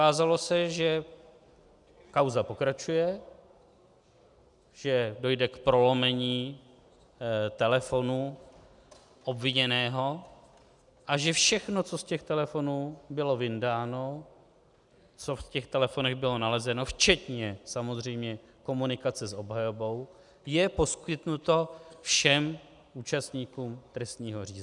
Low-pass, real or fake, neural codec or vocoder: 10.8 kHz; real; none